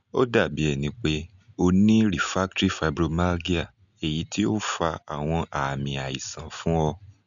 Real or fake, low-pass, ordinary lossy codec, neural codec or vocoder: real; 7.2 kHz; none; none